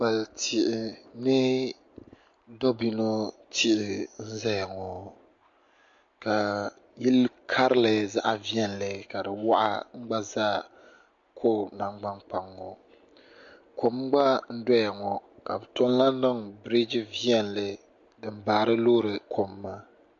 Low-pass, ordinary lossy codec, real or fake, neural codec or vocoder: 7.2 kHz; MP3, 48 kbps; real; none